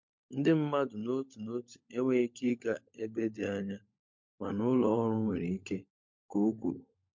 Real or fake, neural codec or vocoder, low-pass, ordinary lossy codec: fake; codec, 16 kHz, 16 kbps, FunCodec, trained on LibriTTS, 50 frames a second; 7.2 kHz; MP3, 48 kbps